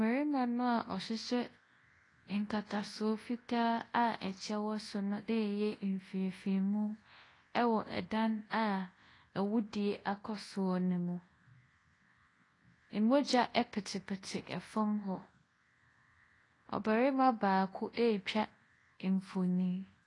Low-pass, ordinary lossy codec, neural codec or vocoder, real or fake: 10.8 kHz; AAC, 32 kbps; codec, 24 kHz, 0.9 kbps, WavTokenizer, large speech release; fake